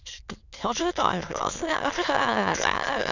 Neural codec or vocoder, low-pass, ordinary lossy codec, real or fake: autoencoder, 22.05 kHz, a latent of 192 numbers a frame, VITS, trained on many speakers; 7.2 kHz; none; fake